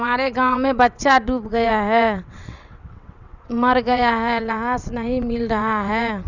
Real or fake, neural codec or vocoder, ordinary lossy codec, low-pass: fake; vocoder, 22.05 kHz, 80 mel bands, WaveNeXt; none; 7.2 kHz